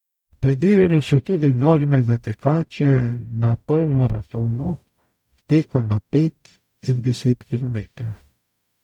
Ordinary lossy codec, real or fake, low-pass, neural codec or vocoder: none; fake; 19.8 kHz; codec, 44.1 kHz, 0.9 kbps, DAC